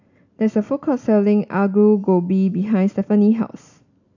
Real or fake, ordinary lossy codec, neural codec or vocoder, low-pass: real; none; none; 7.2 kHz